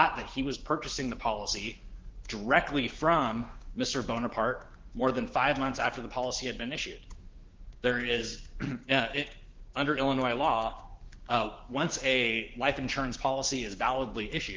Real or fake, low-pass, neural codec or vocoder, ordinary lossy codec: fake; 7.2 kHz; codec, 16 kHz, 6 kbps, DAC; Opus, 16 kbps